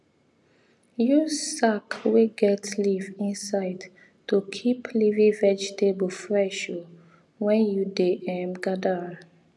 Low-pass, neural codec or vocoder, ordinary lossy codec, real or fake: none; none; none; real